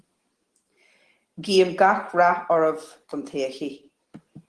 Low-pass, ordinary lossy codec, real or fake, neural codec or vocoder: 10.8 kHz; Opus, 16 kbps; real; none